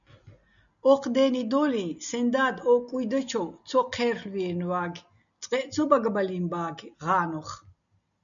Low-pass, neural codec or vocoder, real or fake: 7.2 kHz; none; real